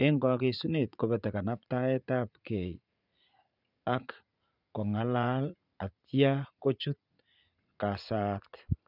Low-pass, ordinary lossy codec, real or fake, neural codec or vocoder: 5.4 kHz; none; fake; vocoder, 44.1 kHz, 80 mel bands, Vocos